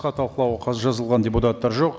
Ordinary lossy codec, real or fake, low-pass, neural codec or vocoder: none; real; none; none